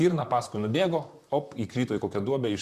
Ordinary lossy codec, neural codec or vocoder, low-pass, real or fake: Opus, 64 kbps; vocoder, 44.1 kHz, 128 mel bands, Pupu-Vocoder; 14.4 kHz; fake